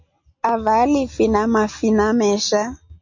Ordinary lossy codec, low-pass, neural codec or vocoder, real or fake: MP3, 48 kbps; 7.2 kHz; none; real